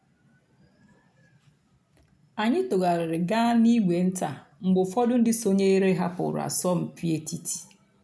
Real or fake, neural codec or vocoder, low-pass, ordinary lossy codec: real; none; none; none